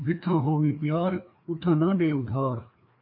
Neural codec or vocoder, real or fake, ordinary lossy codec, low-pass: codec, 16 kHz, 2 kbps, FreqCodec, larger model; fake; MP3, 32 kbps; 5.4 kHz